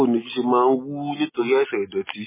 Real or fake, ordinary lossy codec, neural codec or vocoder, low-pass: real; MP3, 16 kbps; none; 3.6 kHz